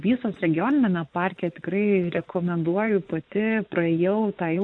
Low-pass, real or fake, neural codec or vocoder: 9.9 kHz; fake; vocoder, 24 kHz, 100 mel bands, Vocos